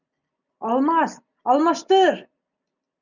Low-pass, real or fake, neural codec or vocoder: 7.2 kHz; real; none